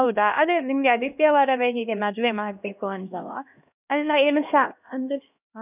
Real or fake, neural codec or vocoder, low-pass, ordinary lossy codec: fake; codec, 16 kHz, 1 kbps, X-Codec, HuBERT features, trained on LibriSpeech; 3.6 kHz; none